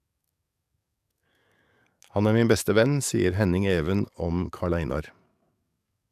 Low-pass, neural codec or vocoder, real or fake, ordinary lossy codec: 14.4 kHz; codec, 44.1 kHz, 7.8 kbps, DAC; fake; none